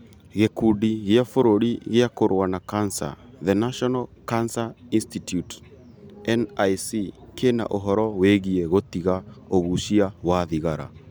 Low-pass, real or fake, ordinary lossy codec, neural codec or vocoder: none; real; none; none